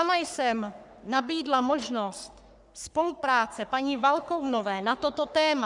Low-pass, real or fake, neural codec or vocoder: 10.8 kHz; fake; codec, 44.1 kHz, 3.4 kbps, Pupu-Codec